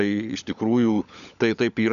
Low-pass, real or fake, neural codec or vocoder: 7.2 kHz; fake; codec, 16 kHz, 16 kbps, FunCodec, trained on LibriTTS, 50 frames a second